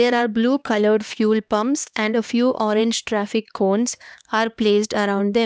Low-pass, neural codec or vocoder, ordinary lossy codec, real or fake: none; codec, 16 kHz, 2 kbps, X-Codec, HuBERT features, trained on LibriSpeech; none; fake